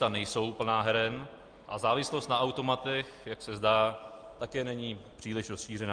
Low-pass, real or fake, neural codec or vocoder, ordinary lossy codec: 9.9 kHz; real; none; Opus, 32 kbps